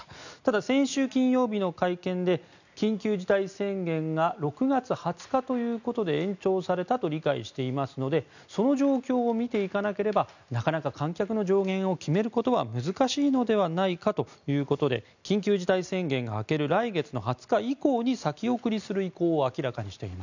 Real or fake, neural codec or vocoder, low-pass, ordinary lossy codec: real; none; 7.2 kHz; none